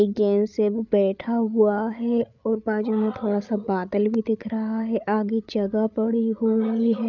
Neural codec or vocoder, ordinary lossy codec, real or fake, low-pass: codec, 16 kHz, 8 kbps, FreqCodec, larger model; none; fake; 7.2 kHz